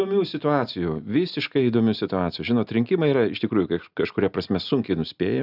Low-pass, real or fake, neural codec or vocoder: 5.4 kHz; real; none